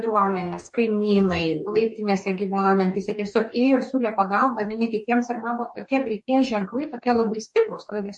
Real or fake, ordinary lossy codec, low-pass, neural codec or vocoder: fake; MP3, 48 kbps; 10.8 kHz; codec, 44.1 kHz, 2.6 kbps, DAC